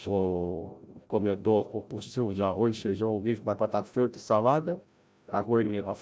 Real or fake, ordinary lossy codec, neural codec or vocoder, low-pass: fake; none; codec, 16 kHz, 0.5 kbps, FreqCodec, larger model; none